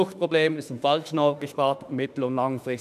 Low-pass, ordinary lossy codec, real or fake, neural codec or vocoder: 14.4 kHz; none; fake; autoencoder, 48 kHz, 32 numbers a frame, DAC-VAE, trained on Japanese speech